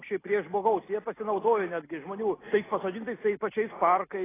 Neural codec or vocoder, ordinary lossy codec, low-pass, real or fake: none; AAC, 16 kbps; 3.6 kHz; real